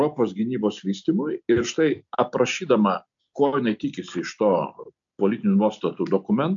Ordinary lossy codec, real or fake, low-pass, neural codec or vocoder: AAC, 64 kbps; real; 7.2 kHz; none